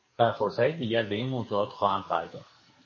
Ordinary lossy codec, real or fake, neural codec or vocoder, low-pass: MP3, 32 kbps; fake; codec, 44.1 kHz, 2.6 kbps, SNAC; 7.2 kHz